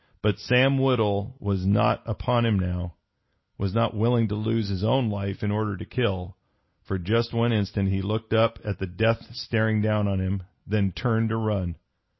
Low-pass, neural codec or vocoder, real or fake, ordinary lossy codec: 7.2 kHz; none; real; MP3, 24 kbps